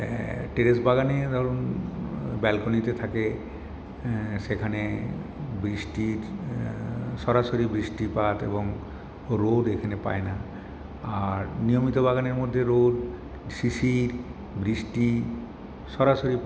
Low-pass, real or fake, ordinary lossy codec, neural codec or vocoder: none; real; none; none